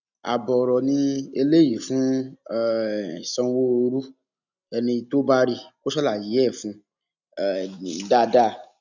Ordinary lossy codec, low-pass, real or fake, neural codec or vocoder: none; 7.2 kHz; real; none